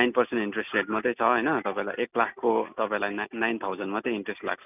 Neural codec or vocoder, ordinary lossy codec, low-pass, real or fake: none; none; 3.6 kHz; real